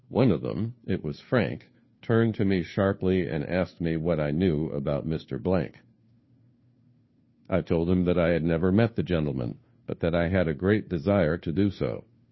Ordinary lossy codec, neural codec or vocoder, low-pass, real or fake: MP3, 24 kbps; codec, 16 kHz, 2 kbps, FunCodec, trained on Chinese and English, 25 frames a second; 7.2 kHz; fake